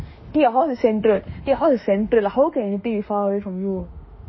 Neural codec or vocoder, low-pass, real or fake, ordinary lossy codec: autoencoder, 48 kHz, 32 numbers a frame, DAC-VAE, trained on Japanese speech; 7.2 kHz; fake; MP3, 24 kbps